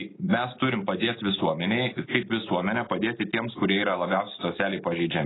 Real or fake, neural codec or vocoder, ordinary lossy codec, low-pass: real; none; AAC, 16 kbps; 7.2 kHz